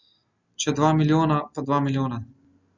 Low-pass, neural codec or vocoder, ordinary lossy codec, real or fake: 7.2 kHz; none; Opus, 64 kbps; real